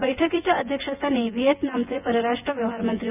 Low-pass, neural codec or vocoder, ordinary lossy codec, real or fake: 3.6 kHz; vocoder, 24 kHz, 100 mel bands, Vocos; none; fake